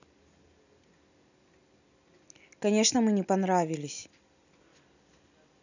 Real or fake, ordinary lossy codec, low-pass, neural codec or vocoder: real; none; 7.2 kHz; none